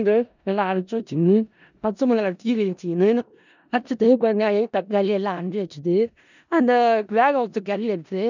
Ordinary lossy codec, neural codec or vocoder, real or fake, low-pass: none; codec, 16 kHz in and 24 kHz out, 0.4 kbps, LongCat-Audio-Codec, four codebook decoder; fake; 7.2 kHz